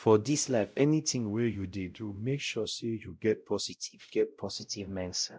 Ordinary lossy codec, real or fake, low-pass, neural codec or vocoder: none; fake; none; codec, 16 kHz, 0.5 kbps, X-Codec, WavLM features, trained on Multilingual LibriSpeech